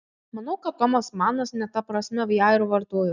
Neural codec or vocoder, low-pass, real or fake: none; 7.2 kHz; real